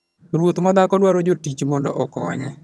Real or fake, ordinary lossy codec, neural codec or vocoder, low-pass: fake; none; vocoder, 22.05 kHz, 80 mel bands, HiFi-GAN; none